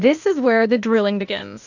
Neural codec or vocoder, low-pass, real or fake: codec, 16 kHz, 0.8 kbps, ZipCodec; 7.2 kHz; fake